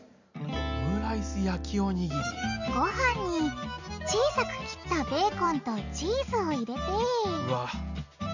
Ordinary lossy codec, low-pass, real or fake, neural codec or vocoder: none; 7.2 kHz; real; none